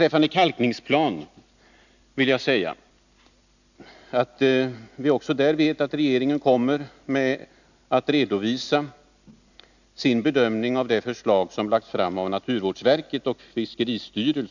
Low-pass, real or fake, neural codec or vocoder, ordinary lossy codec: 7.2 kHz; real; none; none